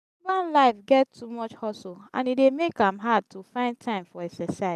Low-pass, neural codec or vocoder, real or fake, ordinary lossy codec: 14.4 kHz; none; real; none